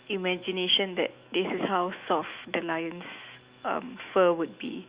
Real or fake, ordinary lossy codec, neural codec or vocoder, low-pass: fake; Opus, 64 kbps; autoencoder, 48 kHz, 128 numbers a frame, DAC-VAE, trained on Japanese speech; 3.6 kHz